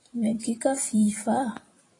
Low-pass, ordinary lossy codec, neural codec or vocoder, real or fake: 10.8 kHz; AAC, 32 kbps; vocoder, 44.1 kHz, 128 mel bands every 256 samples, BigVGAN v2; fake